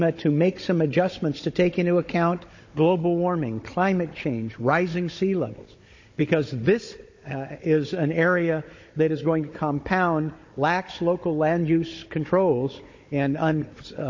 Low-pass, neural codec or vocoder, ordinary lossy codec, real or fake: 7.2 kHz; codec, 16 kHz, 16 kbps, FunCodec, trained on Chinese and English, 50 frames a second; MP3, 32 kbps; fake